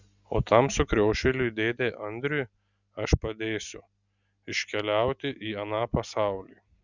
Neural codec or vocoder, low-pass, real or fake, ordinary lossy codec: none; 7.2 kHz; real; Opus, 64 kbps